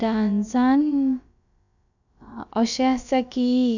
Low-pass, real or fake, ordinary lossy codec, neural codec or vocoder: 7.2 kHz; fake; none; codec, 16 kHz, about 1 kbps, DyCAST, with the encoder's durations